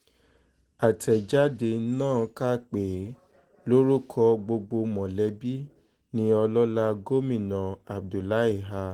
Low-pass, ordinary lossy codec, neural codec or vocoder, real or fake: 19.8 kHz; Opus, 16 kbps; none; real